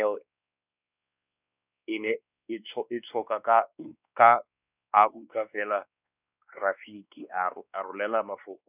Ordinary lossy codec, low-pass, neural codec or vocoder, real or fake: none; 3.6 kHz; codec, 16 kHz, 2 kbps, X-Codec, WavLM features, trained on Multilingual LibriSpeech; fake